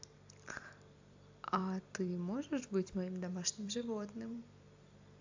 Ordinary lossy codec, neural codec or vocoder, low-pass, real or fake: AAC, 48 kbps; vocoder, 44.1 kHz, 128 mel bands every 256 samples, BigVGAN v2; 7.2 kHz; fake